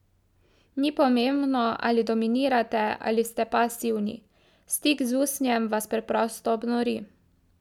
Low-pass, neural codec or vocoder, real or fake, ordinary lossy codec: 19.8 kHz; none; real; none